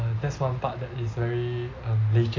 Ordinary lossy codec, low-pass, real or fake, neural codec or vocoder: AAC, 32 kbps; 7.2 kHz; real; none